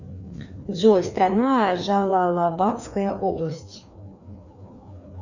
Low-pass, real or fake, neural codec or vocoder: 7.2 kHz; fake; codec, 16 kHz, 2 kbps, FreqCodec, larger model